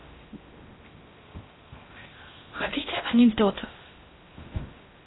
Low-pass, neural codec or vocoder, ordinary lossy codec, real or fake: 7.2 kHz; codec, 16 kHz in and 24 kHz out, 0.6 kbps, FocalCodec, streaming, 2048 codes; AAC, 16 kbps; fake